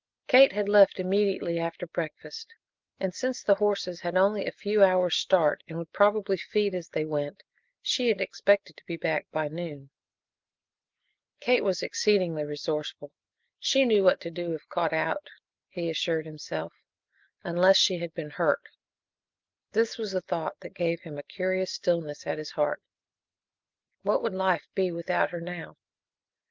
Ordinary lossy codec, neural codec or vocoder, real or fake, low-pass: Opus, 32 kbps; none; real; 7.2 kHz